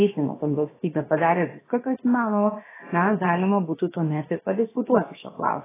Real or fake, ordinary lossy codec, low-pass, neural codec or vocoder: fake; AAC, 16 kbps; 3.6 kHz; codec, 16 kHz, about 1 kbps, DyCAST, with the encoder's durations